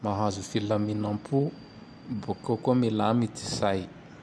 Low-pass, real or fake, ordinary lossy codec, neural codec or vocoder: none; real; none; none